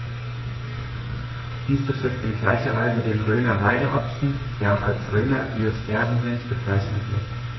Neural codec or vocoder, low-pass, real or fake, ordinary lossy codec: codec, 44.1 kHz, 2.6 kbps, SNAC; 7.2 kHz; fake; MP3, 24 kbps